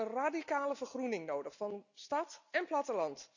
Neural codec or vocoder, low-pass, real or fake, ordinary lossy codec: none; 7.2 kHz; real; none